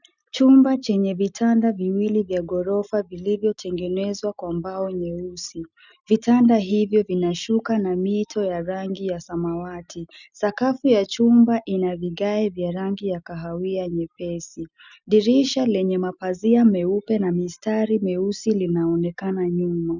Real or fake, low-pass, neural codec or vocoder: real; 7.2 kHz; none